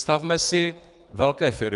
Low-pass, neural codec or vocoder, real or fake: 10.8 kHz; codec, 24 kHz, 3 kbps, HILCodec; fake